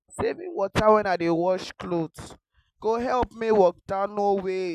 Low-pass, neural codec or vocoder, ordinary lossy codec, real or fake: 14.4 kHz; none; none; real